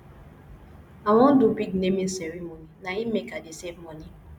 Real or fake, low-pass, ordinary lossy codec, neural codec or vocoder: real; 19.8 kHz; none; none